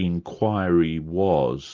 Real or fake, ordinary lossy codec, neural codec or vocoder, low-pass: real; Opus, 32 kbps; none; 7.2 kHz